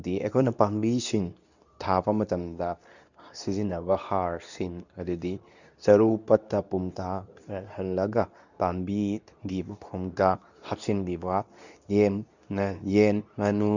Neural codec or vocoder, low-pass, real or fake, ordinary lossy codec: codec, 24 kHz, 0.9 kbps, WavTokenizer, medium speech release version 2; 7.2 kHz; fake; none